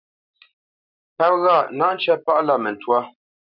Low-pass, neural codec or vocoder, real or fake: 5.4 kHz; none; real